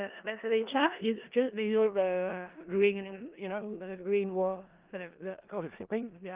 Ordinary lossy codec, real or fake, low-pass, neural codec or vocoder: Opus, 24 kbps; fake; 3.6 kHz; codec, 16 kHz in and 24 kHz out, 0.4 kbps, LongCat-Audio-Codec, four codebook decoder